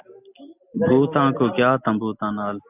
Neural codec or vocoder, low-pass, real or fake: none; 3.6 kHz; real